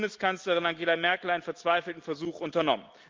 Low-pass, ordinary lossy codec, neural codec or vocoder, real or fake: 7.2 kHz; Opus, 32 kbps; none; real